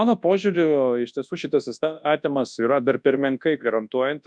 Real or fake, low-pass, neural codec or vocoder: fake; 9.9 kHz; codec, 24 kHz, 0.9 kbps, WavTokenizer, large speech release